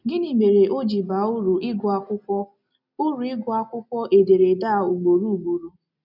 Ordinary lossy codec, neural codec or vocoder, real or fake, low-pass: none; none; real; 5.4 kHz